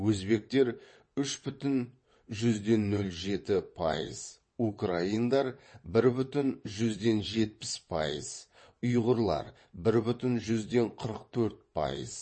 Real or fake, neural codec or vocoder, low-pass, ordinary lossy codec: fake; vocoder, 22.05 kHz, 80 mel bands, WaveNeXt; 9.9 kHz; MP3, 32 kbps